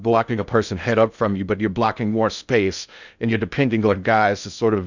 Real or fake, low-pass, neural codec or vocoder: fake; 7.2 kHz; codec, 16 kHz in and 24 kHz out, 0.6 kbps, FocalCodec, streaming, 2048 codes